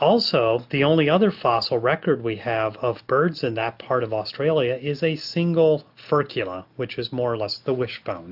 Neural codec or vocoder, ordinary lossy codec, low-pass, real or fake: none; AAC, 48 kbps; 5.4 kHz; real